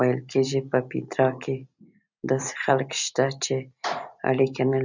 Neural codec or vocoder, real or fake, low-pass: none; real; 7.2 kHz